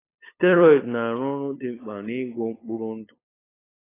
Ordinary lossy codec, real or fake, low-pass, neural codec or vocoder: AAC, 16 kbps; fake; 3.6 kHz; codec, 16 kHz, 8 kbps, FunCodec, trained on LibriTTS, 25 frames a second